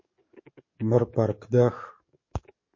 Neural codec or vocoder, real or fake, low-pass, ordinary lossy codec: codec, 44.1 kHz, 7.8 kbps, DAC; fake; 7.2 kHz; MP3, 32 kbps